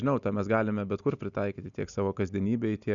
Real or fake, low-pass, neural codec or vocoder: real; 7.2 kHz; none